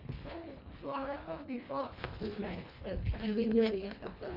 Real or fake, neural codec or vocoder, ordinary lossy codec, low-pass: fake; codec, 24 kHz, 1.5 kbps, HILCodec; none; 5.4 kHz